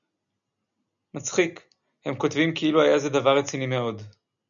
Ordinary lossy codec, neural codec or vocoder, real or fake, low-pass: MP3, 96 kbps; none; real; 7.2 kHz